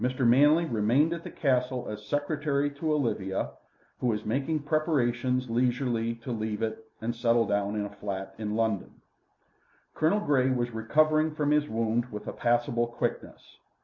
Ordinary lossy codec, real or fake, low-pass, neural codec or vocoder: MP3, 48 kbps; real; 7.2 kHz; none